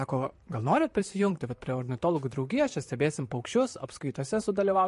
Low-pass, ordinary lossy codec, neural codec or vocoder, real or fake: 14.4 kHz; MP3, 48 kbps; vocoder, 44.1 kHz, 128 mel bands, Pupu-Vocoder; fake